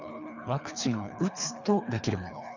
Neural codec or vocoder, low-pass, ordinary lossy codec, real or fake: codec, 24 kHz, 3 kbps, HILCodec; 7.2 kHz; none; fake